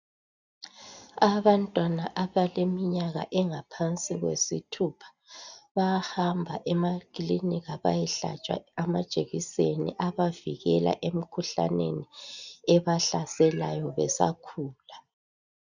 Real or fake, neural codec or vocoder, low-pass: real; none; 7.2 kHz